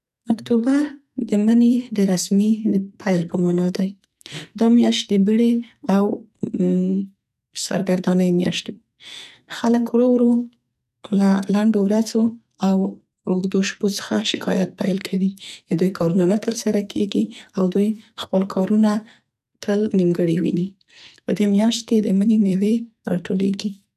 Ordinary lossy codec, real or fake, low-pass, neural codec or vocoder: none; fake; 14.4 kHz; codec, 44.1 kHz, 2.6 kbps, SNAC